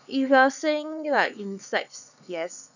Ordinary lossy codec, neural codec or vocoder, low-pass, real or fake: none; codec, 16 kHz, 4 kbps, X-Codec, HuBERT features, trained on LibriSpeech; 7.2 kHz; fake